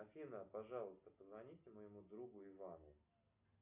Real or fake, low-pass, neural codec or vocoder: real; 3.6 kHz; none